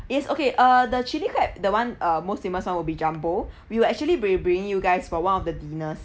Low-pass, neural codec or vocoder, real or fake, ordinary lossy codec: none; none; real; none